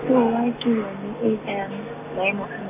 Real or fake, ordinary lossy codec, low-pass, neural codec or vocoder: real; none; 3.6 kHz; none